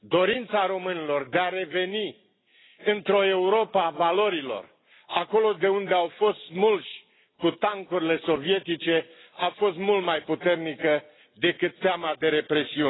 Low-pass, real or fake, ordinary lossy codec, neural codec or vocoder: 7.2 kHz; real; AAC, 16 kbps; none